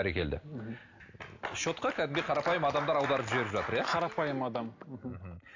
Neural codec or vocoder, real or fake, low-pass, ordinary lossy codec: none; real; 7.2 kHz; AAC, 48 kbps